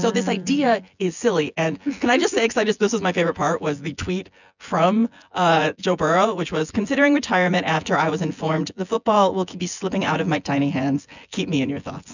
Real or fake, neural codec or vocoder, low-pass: fake; vocoder, 24 kHz, 100 mel bands, Vocos; 7.2 kHz